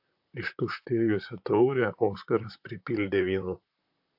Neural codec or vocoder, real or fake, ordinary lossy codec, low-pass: vocoder, 44.1 kHz, 128 mel bands, Pupu-Vocoder; fake; AAC, 48 kbps; 5.4 kHz